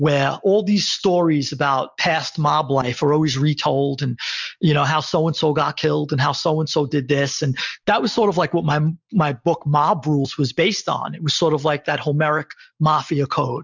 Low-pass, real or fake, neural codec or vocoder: 7.2 kHz; real; none